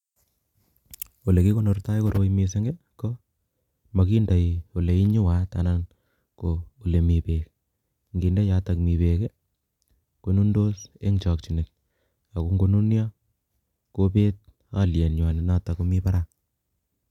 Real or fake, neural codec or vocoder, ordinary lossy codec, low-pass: real; none; none; 19.8 kHz